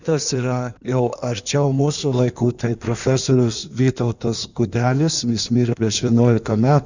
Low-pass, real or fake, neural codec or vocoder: 7.2 kHz; fake; codec, 16 kHz in and 24 kHz out, 1.1 kbps, FireRedTTS-2 codec